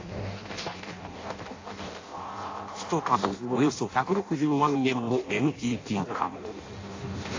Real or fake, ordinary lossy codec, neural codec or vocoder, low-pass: fake; AAC, 32 kbps; codec, 16 kHz in and 24 kHz out, 0.6 kbps, FireRedTTS-2 codec; 7.2 kHz